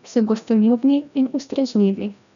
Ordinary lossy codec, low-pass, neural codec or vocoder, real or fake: none; 7.2 kHz; codec, 16 kHz, 1 kbps, FreqCodec, larger model; fake